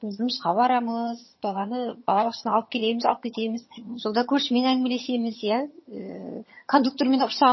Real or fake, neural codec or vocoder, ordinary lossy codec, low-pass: fake; vocoder, 22.05 kHz, 80 mel bands, HiFi-GAN; MP3, 24 kbps; 7.2 kHz